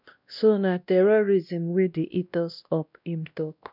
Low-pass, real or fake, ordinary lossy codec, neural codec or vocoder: 5.4 kHz; fake; none; codec, 16 kHz, 1 kbps, X-Codec, WavLM features, trained on Multilingual LibriSpeech